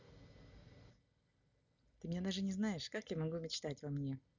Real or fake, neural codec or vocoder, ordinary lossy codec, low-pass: real; none; none; 7.2 kHz